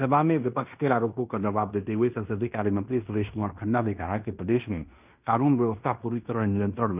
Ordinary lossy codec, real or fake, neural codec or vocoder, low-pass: none; fake; codec, 16 kHz in and 24 kHz out, 0.9 kbps, LongCat-Audio-Codec, fine tuned four codebook decoder; 3.6 kHz